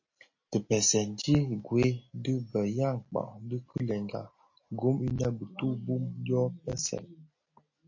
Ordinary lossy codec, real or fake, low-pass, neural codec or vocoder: MP3, 32 kbps; real; 7.2 kHz; none